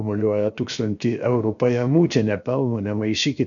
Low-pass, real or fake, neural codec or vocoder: 7.2 kHz; fake; codec, 16 kHz, 0.7 kbps, FocalCodec